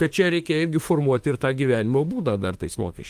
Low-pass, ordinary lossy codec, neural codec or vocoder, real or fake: 14.4 kHz; Opus, 24 kbps; autoencoder, 48 kHz, 32 numbers a frame, DAC-VAE, trained on Japanese speech; fake